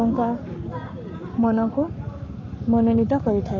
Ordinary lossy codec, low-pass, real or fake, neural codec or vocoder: none; 7.2 kHz; fake; codec, 44.1 kHz, 7.8 kbps, Pupu-Codec